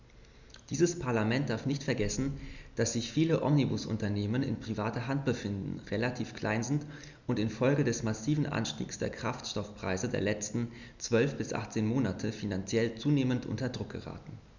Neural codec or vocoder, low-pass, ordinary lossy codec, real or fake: none; 7.2 kHz; none; real